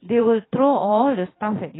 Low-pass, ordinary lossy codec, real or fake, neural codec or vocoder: 7.2 kHz; AAC, 16 kbps; fake; vocoder, 22.05 kHz, 80 mel bands, Vocos